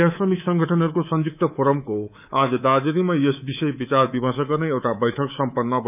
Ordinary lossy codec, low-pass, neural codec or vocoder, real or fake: none; 3.6 kHz; codec, 24 kHz, 3.1 kbps, DualCodec; fake